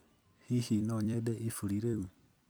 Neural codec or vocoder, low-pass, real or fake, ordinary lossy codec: vocoder, 44.1 kHz, 128 mel bands every 256 samples, BigVGAN v2; none; fake; none